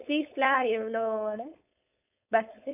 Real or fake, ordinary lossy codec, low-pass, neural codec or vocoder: fake; none; 3.6 kHz; codec, 16 kHz, 4.8 kbps, FACodec